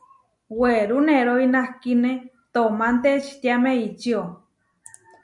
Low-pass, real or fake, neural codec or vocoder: 10.8 kHz; real; none